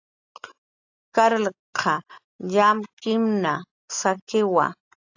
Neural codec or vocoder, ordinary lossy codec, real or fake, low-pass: none; AAC, 48 kbps; real; 7.2 kHz